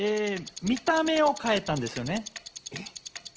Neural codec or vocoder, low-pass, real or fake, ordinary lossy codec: none; 7.2 kHz; real; Opus, 16 kbps